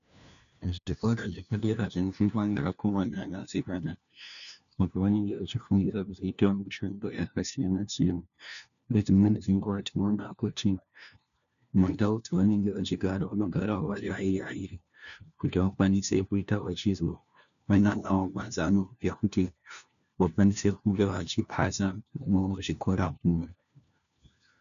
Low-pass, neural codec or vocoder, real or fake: 7.2 kHz; codec, 16 kHz, 1 kbps, FunCodec, trained on LibriTTS, 50 frames a second; fake